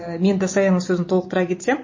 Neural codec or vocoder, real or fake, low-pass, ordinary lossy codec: none; real; 7.2 kHz; MP3, 32 kbps